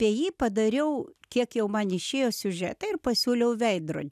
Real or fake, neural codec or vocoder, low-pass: real; none; 14.4 kHz